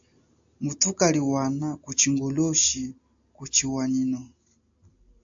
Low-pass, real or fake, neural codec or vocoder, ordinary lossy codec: 7.2 kHz; real; none; AAC, 64 kbps